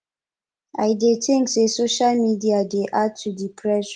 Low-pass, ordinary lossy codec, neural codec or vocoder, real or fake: 9.9 kHz; Opus, 24 kbps; none; real